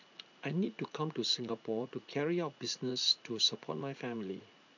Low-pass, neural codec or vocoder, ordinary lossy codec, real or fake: 7.2 kHz; vocoder, 44.1 kHz, 80 mel bands, Vocos; none; fake